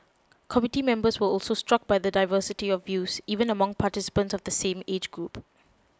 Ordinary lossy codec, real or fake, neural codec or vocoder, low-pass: none; real; none; none